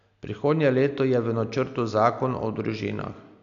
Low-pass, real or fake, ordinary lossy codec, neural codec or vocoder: 7.2 kHz; real; none; none